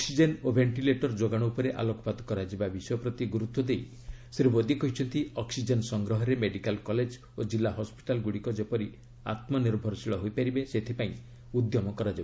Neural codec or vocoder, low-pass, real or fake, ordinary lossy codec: none; none; real; none